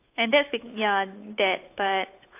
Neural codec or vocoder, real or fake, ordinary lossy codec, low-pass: codec, 16 kHz, 8 kbps, FunCodec, trained on Chinese and English, 25 frames a second; fake; AAC, 24 kbps; 3.6 kHz